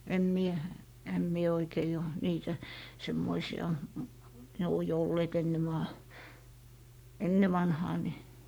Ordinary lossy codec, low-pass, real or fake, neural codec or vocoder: none; none; fake; codec, 44.1 kHz, 7.8 kbps, Pupu-Codec